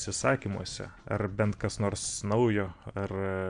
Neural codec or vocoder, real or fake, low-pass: none; real; 9.9 kHz